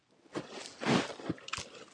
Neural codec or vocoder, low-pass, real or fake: none; 9.9 kHz; real